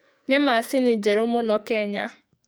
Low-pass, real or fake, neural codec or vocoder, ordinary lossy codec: none; fake; codec, 44.1 kHz, 2.6 kbps, SNAC; none